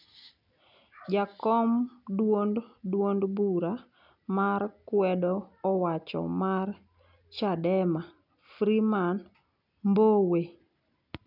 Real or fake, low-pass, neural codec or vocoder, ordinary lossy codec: real; 5.4 kHz; none; none